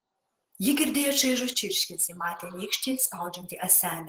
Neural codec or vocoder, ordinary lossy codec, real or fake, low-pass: vocoder, 48 kHz, 128 mel bands, Vocos; Opus, 16 kbps; fake; 19.8 kHz